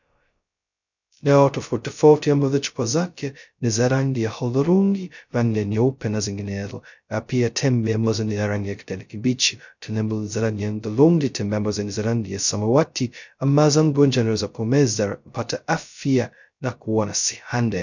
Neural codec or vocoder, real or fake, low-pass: codec, 16 kHz, 0.2 kbps, FocalCodec; fake; 7.2 kHz